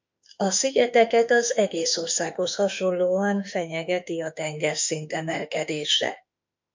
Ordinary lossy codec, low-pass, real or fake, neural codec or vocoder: MP3, 64 kbps; 7.2 kHz; fake; autoencoder, 48 kHz, 32 numbers a frame, DAC-VAE, trained on Japanese speech